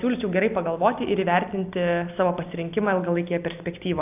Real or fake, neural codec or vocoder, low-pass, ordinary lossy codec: real; none; 3.6 kHz; AAC, 32 kbps